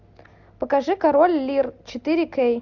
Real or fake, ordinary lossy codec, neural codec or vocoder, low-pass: real; MP3, 64 kbps; none; 7.2 kHz